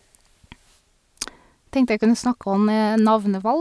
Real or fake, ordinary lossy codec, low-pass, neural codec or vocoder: real; none; none; none